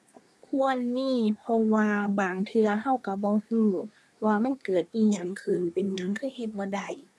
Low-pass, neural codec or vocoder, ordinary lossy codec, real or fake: none; codec, 24 kHz, 1 kbps, SNAC; none; fake